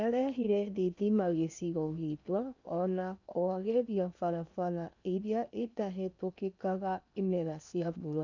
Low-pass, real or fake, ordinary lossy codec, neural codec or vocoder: 7.2 kHz; fake; none; codec, 16 kHz in and 24 kHz out, 0.8 kbps, FocalCodec, streaming, 65536 codes